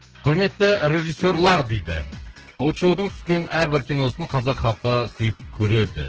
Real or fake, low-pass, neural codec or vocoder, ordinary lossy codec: fake; 7.2 kHz; codec, 32 kHz, 1.9 kbps, SNAC; Opus, 16 kbps